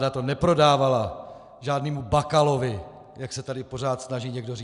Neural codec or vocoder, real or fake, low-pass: none; real; 10.8 kHz